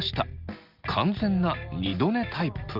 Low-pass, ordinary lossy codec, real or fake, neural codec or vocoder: 5.4 kHz; Opus, 32 kbps; real; none